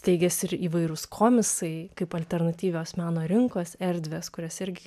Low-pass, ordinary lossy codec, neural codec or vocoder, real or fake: 14.4 kHz; AAC, 96 kbps; none; real